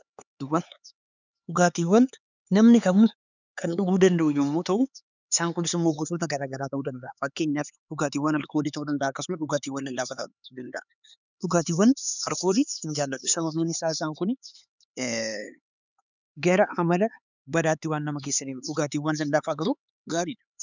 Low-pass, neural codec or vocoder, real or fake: 7.2 kHz; codec, 16 kHz, 4 kbps, X-Codec, HuBERT features, trained on LibriSpeech; fake